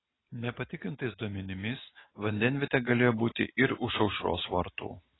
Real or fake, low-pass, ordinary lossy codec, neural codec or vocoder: real; 7.2 kHz; AAC, 16 kbps; none